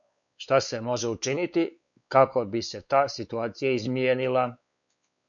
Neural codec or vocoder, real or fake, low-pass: codec, 16 kHz, 4 kbps, X-Codec, WavLM features, trained on Multilingual LibriSpeech; fake; 7.2 kHz